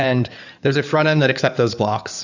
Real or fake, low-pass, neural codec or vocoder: fake; 7.2 kHz; codec, 16 kHz in and 24 kHz out, 2.2 kbps, FireRedTTS-2 codec